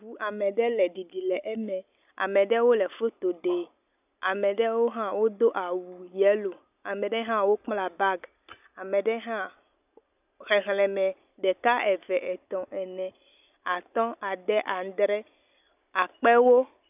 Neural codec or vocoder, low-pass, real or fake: none; 3.6 kHz; real